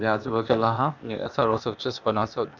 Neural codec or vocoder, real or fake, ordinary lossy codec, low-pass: codec, 16 kHz, 0.8 kbps, ZipCodec; fake; none; 7.2 kHz